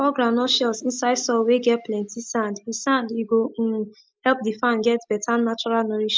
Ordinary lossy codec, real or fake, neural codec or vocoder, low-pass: none; real; none; none